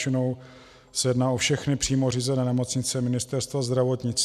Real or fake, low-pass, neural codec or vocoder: real; 14.4 kHz; none